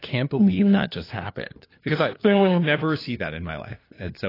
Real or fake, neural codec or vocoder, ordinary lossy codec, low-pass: fake; codec, 16 kHz, 2 kbps, FunCodec, trained on LibriTTS, 25 frames a second; AAC, 24 kbps; 5.4 kHz